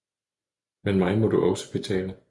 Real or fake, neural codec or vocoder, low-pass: real; none; 10.8 kHz